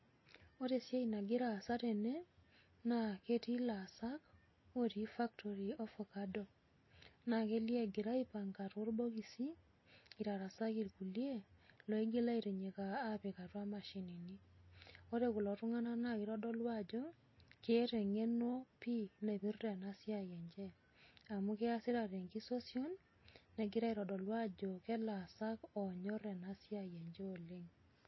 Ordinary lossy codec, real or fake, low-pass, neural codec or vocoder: MP3, 24 kbps; real; 7.2 kHz; none